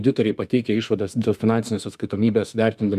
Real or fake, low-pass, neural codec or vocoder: fake; 14.4 kHz; autoencoder, 48 kHz, 32 numbers a frame, DAC-VAE, trained on Japanese speech